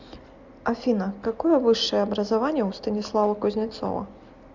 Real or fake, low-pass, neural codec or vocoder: real; 7.2 kHz; none